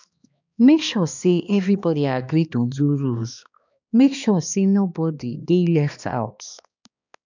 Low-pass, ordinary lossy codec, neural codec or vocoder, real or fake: 7.2 kHz; none; codec, 16 kHz, 2 kbps, X-Codec, HuBERT features, trained on balanced general audio; fake